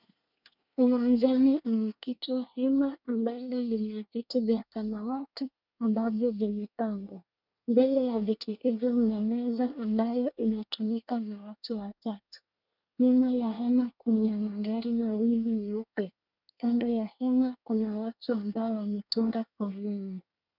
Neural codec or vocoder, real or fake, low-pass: codec, 24 kHz, 1 kbps, SNAC; fake; 5.4 kHz